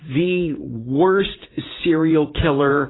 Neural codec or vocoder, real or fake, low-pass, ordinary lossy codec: vocoder, 44.1 kHz, 80 mel bands, Vocos; fake; 7.2 kHz; AAC, 16 kbps